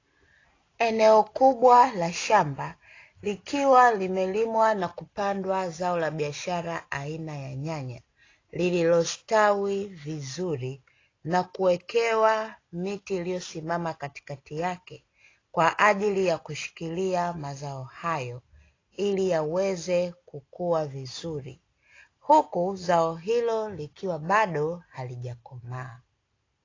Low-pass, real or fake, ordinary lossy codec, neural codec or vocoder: 7.2 kHz; real; AAC, 32 kbps; none